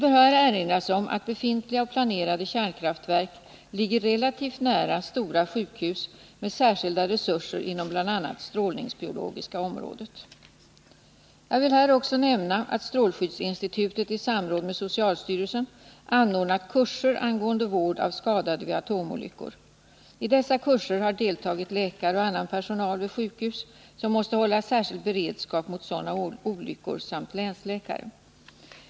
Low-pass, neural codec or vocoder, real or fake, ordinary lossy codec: none; none; real; none